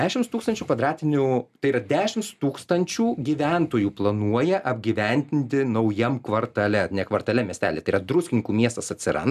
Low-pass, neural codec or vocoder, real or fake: 14.4 kHz; none; real